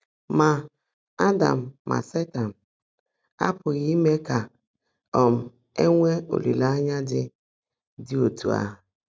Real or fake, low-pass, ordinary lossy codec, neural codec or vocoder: real; none; none; none